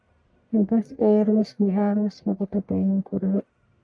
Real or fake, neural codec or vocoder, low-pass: fake; codec, 44.1 kHz, 1.7 kbps, Pupu-Codec; 9.9 kHz